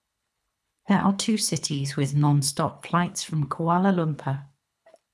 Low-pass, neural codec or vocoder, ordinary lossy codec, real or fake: none; codec, 24 kHz, 3 kbps, HILCodec; none; fake